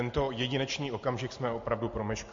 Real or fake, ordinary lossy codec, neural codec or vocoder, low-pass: real; MP3, 48 kbps; none; 7.2 kHz